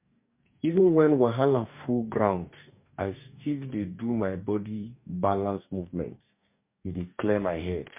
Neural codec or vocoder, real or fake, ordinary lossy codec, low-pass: codec, 44.1 kHz, 2.6 kbps, DAC; fake; MP3, 32 kbps; 3.6 kHz